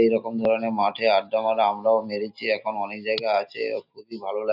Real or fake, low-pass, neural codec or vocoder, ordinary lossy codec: real; 5.4 kHz; none; none